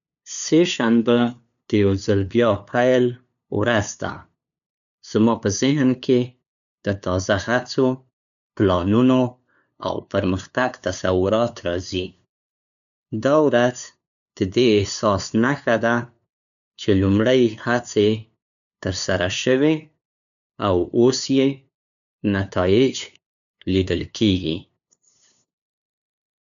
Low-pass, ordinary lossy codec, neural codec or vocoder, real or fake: 7.2 kHz; none; codec, 16 kHz, 2 kbps, FunCodec, trained on LibriTTS, 25 frames a second; fake